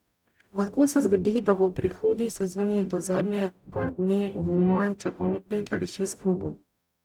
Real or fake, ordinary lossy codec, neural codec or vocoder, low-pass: fake; none; codec, 44.1 kHz, 0.9 kbps, DAC; 19.8 kHz